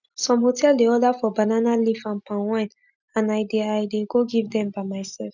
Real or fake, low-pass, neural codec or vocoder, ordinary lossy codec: real; 7.2 kHz; none; none